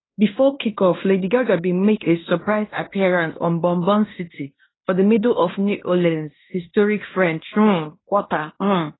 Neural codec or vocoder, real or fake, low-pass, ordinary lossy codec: codec, 16 kHz in and 24 kHz out, 0.9 kbps, LongCat-Audio-Codec, fine tuned four codebook decoder; fake; 7.2 kHz; AAC, 16 kbps